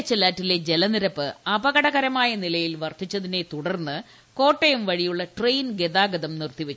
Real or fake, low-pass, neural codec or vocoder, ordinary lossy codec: real; none; none; none